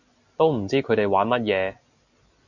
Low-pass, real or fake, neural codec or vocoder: 7.2 kHz; real; none